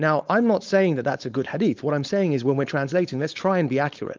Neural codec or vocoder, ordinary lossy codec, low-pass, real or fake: codec, 16 kHz, 4.8 kbps, FACodec; Opus, 24 kbps; 7.2 kHz; fake